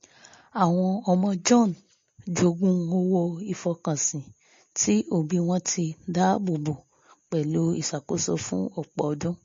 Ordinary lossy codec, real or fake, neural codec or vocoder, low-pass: MP3, 32 kbps; real; none; 7.2 kHz